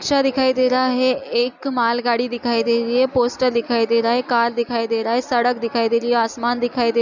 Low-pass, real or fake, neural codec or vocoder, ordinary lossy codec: 7.2 kHz; real; none; none